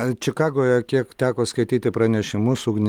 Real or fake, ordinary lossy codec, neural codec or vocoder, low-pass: real; Opus, 32 kbps; none; 19.8 kHz